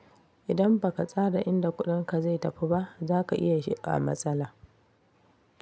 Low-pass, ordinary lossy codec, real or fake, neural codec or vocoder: none; none; real; none